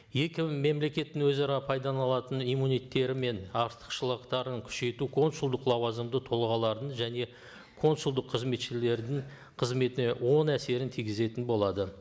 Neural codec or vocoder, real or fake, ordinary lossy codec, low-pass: none; real; none; none